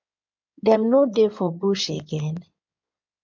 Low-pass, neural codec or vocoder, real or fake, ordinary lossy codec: 7.2 kHz; codec, 16 kHz in and 24 kHz out, 2.2 kbps, FireRedTTS-2 codec; fake; MP3, 64 kbps